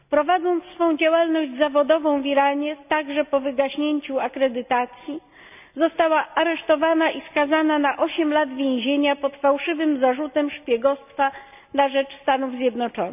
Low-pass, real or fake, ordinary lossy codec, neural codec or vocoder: 3.6 kHz; real; none; none